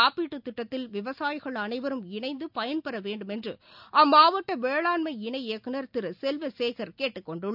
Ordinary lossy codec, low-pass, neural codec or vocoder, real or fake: none; 5.4 kHz; none; real